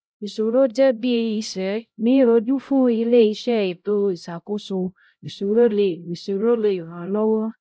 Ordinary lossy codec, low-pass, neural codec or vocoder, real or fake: none; none; codec, 16 kHz, 0.5 kbps, X-Codec, HuBERT features, trained on LibriSpeech; fake